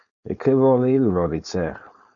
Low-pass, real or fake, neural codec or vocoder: 7.2 kHz; fake; codec, 16 kHz, 4.8 kbps, FACodec